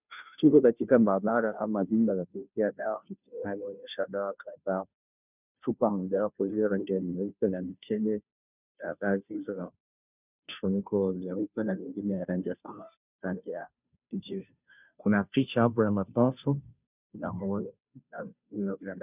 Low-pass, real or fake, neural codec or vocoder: 3.6 kHz; fake; codec, 16 kHz, 0.5 kbps, FunCodec, trained on Chinese and English, 25 frames a second